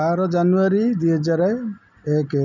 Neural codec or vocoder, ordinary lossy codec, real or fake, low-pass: none; none; real; 7.2 kHz